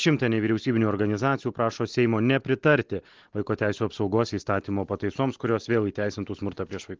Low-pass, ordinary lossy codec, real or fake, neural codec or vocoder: 7.2 kHz; Opus, 16 kbps; real; none